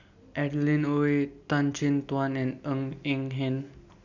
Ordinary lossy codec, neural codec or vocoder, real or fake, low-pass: none; none; real; 7.2 kHz